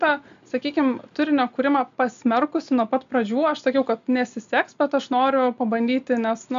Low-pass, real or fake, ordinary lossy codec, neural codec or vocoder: 7.2 kHz; real; MP3, 64 kbps; none